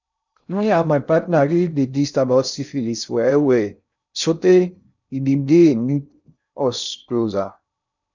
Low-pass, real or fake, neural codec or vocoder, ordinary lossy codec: 7.2 kHz; fake; codec, 16 kHz in and 24 kHz out, 0.6 kbps, FocalCodec, streaming, 2048 codes; none